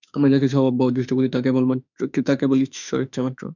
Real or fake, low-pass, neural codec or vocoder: fake; 7.2 kHz; autoencoder, 48 kHz, 32 numbers a frame, DAC-VAE, trained on Japanese speech